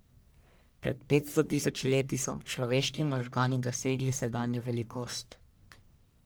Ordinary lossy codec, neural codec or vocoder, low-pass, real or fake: none; codec, 44.1 kHz, 1.7 kbps, Pupu-Codec; none; fake